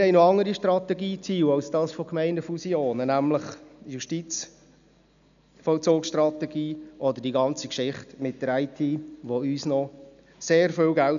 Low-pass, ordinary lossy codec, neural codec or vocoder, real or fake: 7.2 kHz; none; none; real